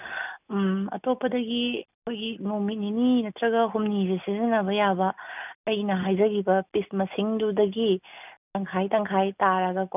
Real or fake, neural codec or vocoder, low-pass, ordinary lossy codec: real; none; 3.6 kHz; none